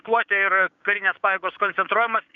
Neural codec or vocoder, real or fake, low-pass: codec, 16 kHz, 6 kbps, DAC; fake; 7.2 kHz